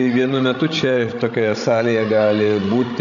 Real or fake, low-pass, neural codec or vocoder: fake; 7.2 kHz; codec, 16 kHz, 16 kbps, FreqCodec, larger model